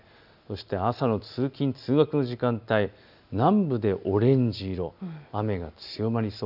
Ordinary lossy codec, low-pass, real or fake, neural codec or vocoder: none; 5.4 kHz; real; none